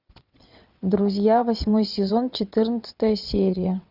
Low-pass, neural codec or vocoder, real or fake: 5.4 kHz; vocoder, 22.05 kHz, 80 mel bands, WaveNeXt; fake